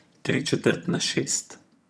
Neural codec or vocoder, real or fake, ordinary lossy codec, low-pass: vocoder, 22.05 kHz, 80 mel bands, HiFi-GAN; fake; none; none